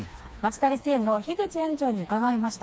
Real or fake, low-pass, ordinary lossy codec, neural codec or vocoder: fake; none; none; codec, 16 kHz, 2 kbps, FreqCodec, smaller model